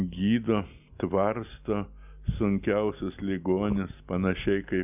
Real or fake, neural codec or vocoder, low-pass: real; none; 3.6 kHz